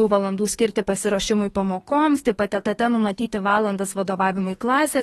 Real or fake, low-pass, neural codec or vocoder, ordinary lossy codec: fake; 14.4 kHz; codec, 32 kHz, 1.9 kbps, SNAC; AAC, 32 kbps